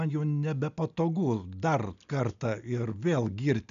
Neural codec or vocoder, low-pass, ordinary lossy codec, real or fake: none; 7.2 kHz; MP3, 96 kbps; real